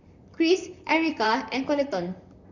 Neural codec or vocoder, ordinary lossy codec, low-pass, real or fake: vocoder, 44.1 kHz, 128 mel bands, Pupu-Vocoder; none; 7.2 kHz; fake